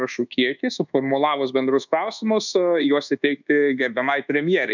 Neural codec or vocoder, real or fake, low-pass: codec, 24 kHz, 1.2 kbps, DualCodec; fake; 7.2 kHz